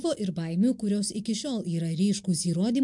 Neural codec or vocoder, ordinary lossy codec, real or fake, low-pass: none; MP3, 64 kbps; real; 10.8 kHz